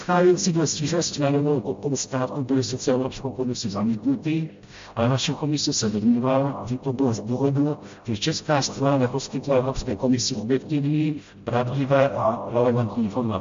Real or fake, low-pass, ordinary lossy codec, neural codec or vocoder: fake; 7.2 kHz; MP3, 48 kbps; codec, 16 kHz, 0.5 kbps, FreqCodec, smaller model